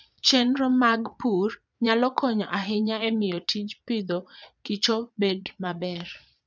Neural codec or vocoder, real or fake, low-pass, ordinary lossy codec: vocoder, 22.05 kHz, 80 mel bands, Vocos; fake; 7.2 kHz; none